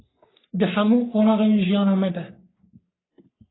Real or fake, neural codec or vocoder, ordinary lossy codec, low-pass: fake; codec, 44.1 kHz, 3.4 kbps, Pupu-Codec; AAC, 16 kbps; 7.2 kHz